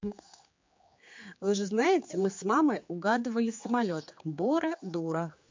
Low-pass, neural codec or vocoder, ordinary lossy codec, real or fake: 7.2 kHz; codec, 16 kHz, 4 kbps, X-Codec, HuBERT features, trained on general audio; MP3, 48 kbps; fake